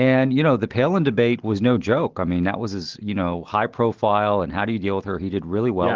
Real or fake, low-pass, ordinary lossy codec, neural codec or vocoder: real; 7.2 kHz; Opus, 16 kbps; none